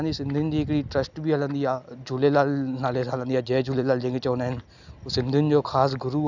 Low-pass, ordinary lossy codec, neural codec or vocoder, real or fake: 7.2 kHz; none; none; real